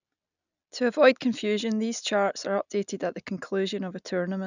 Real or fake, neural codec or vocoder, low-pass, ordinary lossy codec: real; none; 7.2 kHz; none